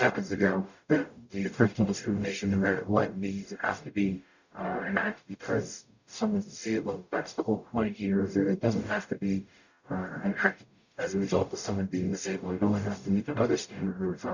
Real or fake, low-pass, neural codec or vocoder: fake; 7.2 kHz; codec, 44.1 kHz, 0.9 kbps, DAC